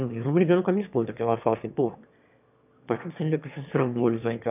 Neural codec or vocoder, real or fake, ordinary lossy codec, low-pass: autoencoder, 22.05 kHz, a latent of 192 numbers a frame, VITS, trained on one speaker; fake; none; 3.6 kHz